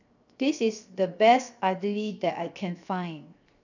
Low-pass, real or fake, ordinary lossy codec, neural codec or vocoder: 7.2 kHz; fake; none; codec, 16 kHz, 0.7 kbps, FocalCodec